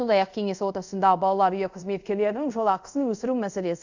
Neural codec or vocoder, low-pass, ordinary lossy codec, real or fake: codec, 24 kHz, 0.5 kbps, DualCodec; 7.2 kHz; none; fake